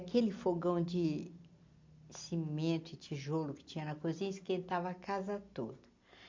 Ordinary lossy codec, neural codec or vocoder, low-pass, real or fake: MP3, 48 kbps; none; 7.2 kHz; real